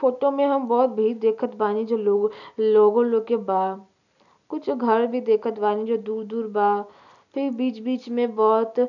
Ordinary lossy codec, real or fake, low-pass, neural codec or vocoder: none; real; 7.2 kHz; none